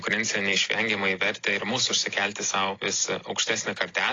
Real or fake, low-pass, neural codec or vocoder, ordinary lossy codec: real; 7.2 kHz; none; AAC, 32 kbps